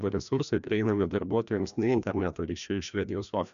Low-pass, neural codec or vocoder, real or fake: 7.2 kHz; codec, 16 kHz, 1 kbps, FreqCodec, larger model; fake